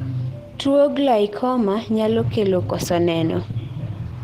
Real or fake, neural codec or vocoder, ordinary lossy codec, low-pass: real; none; Opus, 16 kbps; 14.4 kHz